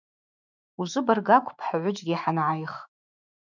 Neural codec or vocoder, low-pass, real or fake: autoencoder, 48 kHz, 128 numbers a frame, DAC-VAE, trained on Japanese speech; 7.2 kHz; fake